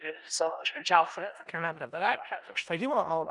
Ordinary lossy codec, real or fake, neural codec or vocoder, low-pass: Opus, 64 kbps; fake; codec, 16 kHz in and 24 kHz out, 0.4 kbps, LongCat-Audio-Codec, four codebook decoder; 10.8 kHz